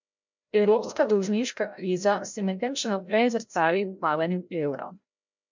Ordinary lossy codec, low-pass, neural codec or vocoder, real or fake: MP3, 64 kbps; 7.2 kHz; codec, 16 kHz, 0.5 kbps, FreqCodec, larger model; fake